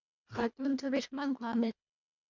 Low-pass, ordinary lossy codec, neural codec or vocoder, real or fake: 7.2 kHz; MP3, 64 kbps; codec, 24 kHz, 1.5 kbps, HILCodec; fake